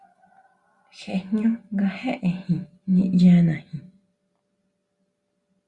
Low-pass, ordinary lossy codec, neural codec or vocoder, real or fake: 10.8 kHz; Opus, 64 kbps; none; real